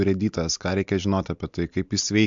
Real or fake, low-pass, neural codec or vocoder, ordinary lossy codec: real; 7.2 kHz; none; MP3, 64 kbps